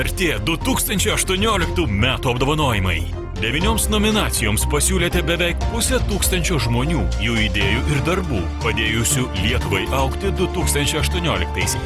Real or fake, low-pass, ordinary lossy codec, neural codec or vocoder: real; 14.4 kHz; Opus, 24 kbps; none